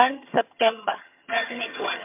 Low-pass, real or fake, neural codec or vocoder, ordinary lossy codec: 3.6 kHz; fake; vocoder, 22.05 kHz, 80 mel bands, HiFi-GAN; MP3, 32 kbps